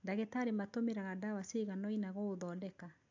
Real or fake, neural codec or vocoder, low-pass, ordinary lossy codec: real; none; 7.2 kHz; AAC, 48 kbps